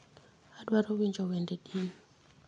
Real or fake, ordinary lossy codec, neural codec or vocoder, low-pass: real; MP3, 64 kbps; none; 9.9 kHz